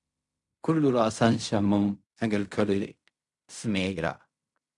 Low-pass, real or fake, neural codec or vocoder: 10.8 kHz; fake; codec, 16 kHz in and 24 kHz out, 0.4 kbps, LongCat-Audio-Codec, fine tuned four codebook decoder